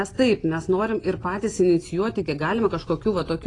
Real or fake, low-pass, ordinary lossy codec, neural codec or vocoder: real; 10.8 kHz; AAC, 32 kbps; none